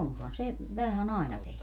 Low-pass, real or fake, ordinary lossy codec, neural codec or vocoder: 19.8 kHz; fake; none; vocoder, 48 kHz, 128 mel bands, Vocos